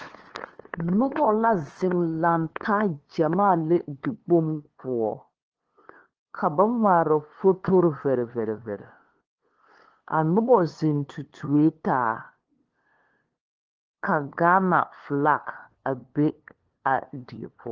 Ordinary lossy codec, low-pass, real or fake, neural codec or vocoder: Opus, 16 kbps; 7.2 kHz; fake; codec, 16 kHz, 2 kbps, FunCodec, trained on LibriTTS, 25 frames a second